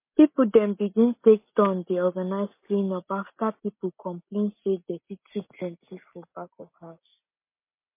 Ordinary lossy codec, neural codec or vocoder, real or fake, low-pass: MP3, 24 kbps; none; real; 3.6 kHz